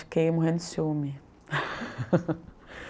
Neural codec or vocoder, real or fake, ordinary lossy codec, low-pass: none; real; none; none